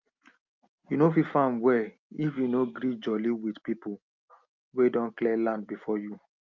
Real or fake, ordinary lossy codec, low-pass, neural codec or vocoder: real; Opus, 24 kbps; 7.2 kHz; none